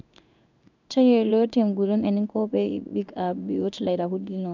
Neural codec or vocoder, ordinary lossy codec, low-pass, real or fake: codec, 16 kHz in and 24 kHz out, 1 kbps, XY-Tokenizer; none; 7.2 kHz; fake